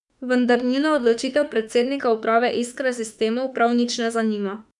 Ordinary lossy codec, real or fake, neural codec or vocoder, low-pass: none; fake; autoencoder, 48 kHz, 32 numbers a frame, DAC-VAE, trained on Japanese speech; 10.8 kHz